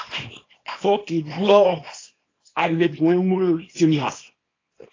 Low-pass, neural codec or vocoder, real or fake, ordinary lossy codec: 7.2 kHz; codec, 24 kHz, 0.9 kbps, WavTokenizer, small release; fake; AAC, 32 kbps